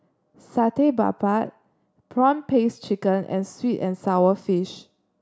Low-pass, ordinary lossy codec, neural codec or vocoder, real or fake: none; none; none; real